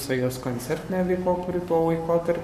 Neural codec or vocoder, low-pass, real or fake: codec, 44.1 kHz, 7.8 kbps, Pupu-Codec; 14.4 kHz; fake